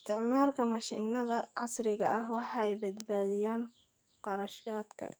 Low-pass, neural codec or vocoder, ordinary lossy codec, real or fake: none; codec, 44.1 kHz, 2.6 kbps, SNAC; none; fake